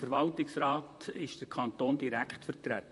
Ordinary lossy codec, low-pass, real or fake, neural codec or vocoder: MP3, 48 kbps; 14.4 kHz; fake; vocoder, 44.1 kHz, 128 mel bands, Pupu-Vocoder